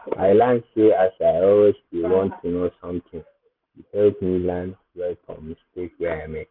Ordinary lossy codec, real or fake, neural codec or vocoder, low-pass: none; real; none; 5.4 kHz